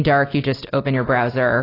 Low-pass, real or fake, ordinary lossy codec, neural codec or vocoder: 5.4 kHz; real; AAC, 24 kbps; none